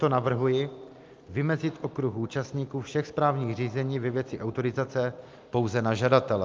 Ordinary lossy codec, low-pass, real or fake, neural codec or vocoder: Opus, 24 kbps; 7.2 kHz; real; none